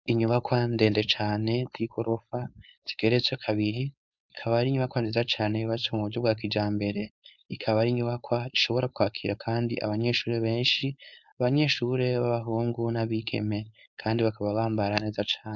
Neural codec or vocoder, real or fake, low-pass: codec, 16 kHz, 4.8 kbps, FACodec; fake; 7.2 kHz